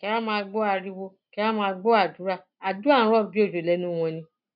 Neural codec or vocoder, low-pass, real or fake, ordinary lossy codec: none; 5.4 kHz; real; none